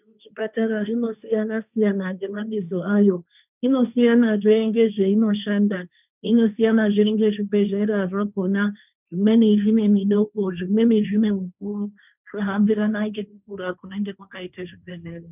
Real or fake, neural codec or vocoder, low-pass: fake; codec, 16 kHz, 1.1 kbps, Voila-Tokenizer; 3.6 kHz